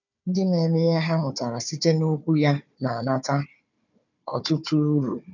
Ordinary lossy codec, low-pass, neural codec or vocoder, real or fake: none; 7.2 kHz; codec, 16 kHz, 4 kbps, FunCodec, trained on Chinese and English, 50 frames a second; fake